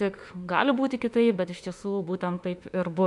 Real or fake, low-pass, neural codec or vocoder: fake; 10.8 kHz; autoencoder, 48 kHz, 32 numbers a frame, DAC-VAE, trained on Japanese speech